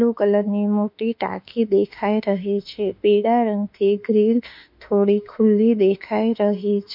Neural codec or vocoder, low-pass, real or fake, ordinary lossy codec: autoencoder, 48 kHz, 32 numbers a frame, DAC-VAE, trained on Japanese speech; 5.4 kHz; fake; MP3, 48 kbps